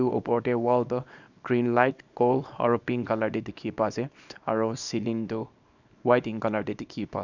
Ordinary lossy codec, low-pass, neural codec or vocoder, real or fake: none; 7.2 kHz; codec, 24 kHz, 0.9 kbps, WavTokenizer, small release; fake